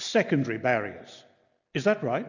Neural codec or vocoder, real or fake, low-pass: none; real; 7.2 kHz